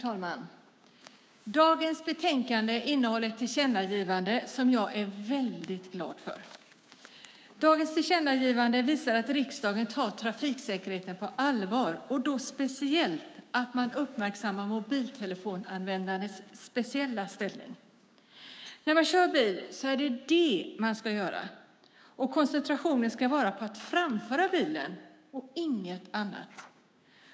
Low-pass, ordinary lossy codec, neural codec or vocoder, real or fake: none; none; codec, 16 kHz, 6 kbps, DAC; fake